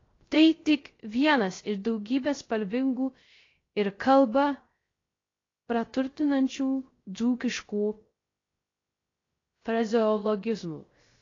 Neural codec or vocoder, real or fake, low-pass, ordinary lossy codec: codec, 16 kHz, 0.3 kbps, FocalCodec; fake; 7.2 kHz; AAC, 32 kbps